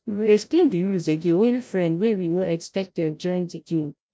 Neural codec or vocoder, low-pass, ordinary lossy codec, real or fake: codec, 16 kHz, 0.5 kbps, FreqCodec, larger model; none; none; fake